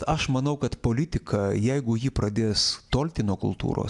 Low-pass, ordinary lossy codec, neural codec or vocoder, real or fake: 10.8 kHz; MP3, 96 kbps; none; real